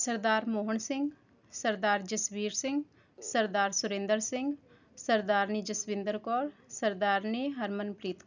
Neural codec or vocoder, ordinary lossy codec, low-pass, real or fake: none; none; 7.2 kHz; real